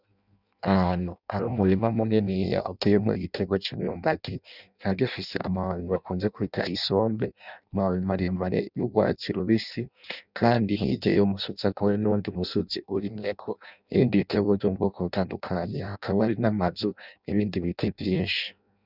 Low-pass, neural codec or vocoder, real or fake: 5.4 kHz; codec, 16 kHz in and 24 kHz out, 0.6 kbps, FireRedTTS-2 codec; fake